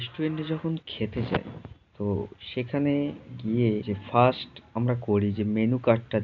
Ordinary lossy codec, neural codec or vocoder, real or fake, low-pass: none; none; real; 7.2 kHz